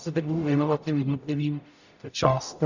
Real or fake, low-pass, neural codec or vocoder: fake; 7.2 kHz; codec, 44.1 kHz, 0.9 kbps, DAC